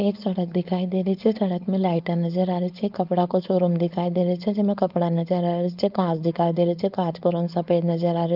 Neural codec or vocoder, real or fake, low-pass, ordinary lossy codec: codec, 16 kHz, 4.8 kbps, FACodec; fake; 5.4 kHz; Opus, 24 kbps